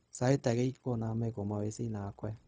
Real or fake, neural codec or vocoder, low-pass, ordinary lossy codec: fake; codec, 16 kHz, 0.4 kbps, LongCat-Audio-Codec; none; none